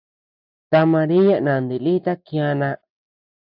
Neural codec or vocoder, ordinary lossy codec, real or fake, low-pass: none; AAC, 48 kbps; real; 5.4 kHz